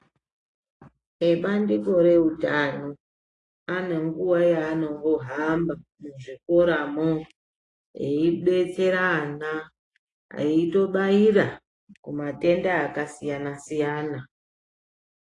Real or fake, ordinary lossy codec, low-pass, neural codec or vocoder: real; AAC, 32 kbps; 10.8 kHz; none